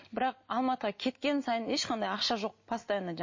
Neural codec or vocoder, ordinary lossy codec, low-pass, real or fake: none; MP3, 32 kbps; 7.2 kHz; real